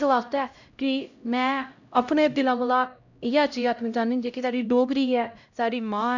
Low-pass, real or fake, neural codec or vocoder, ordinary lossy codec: 7.2 kHz; fake; codec, 16 kHz, 0.5 kbps, X-Codec, HuBERT features, trained on LibriSpeech; none